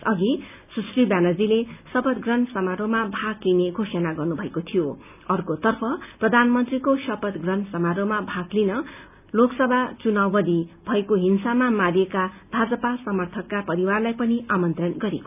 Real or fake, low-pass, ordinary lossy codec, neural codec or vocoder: real; 3.6 kHz; none; none